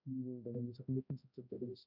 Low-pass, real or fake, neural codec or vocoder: 5.4 kHz; fake; codec, 16 kHz, 0.5 kbps, X-Codec, HuBERT features, trained on balanced general audio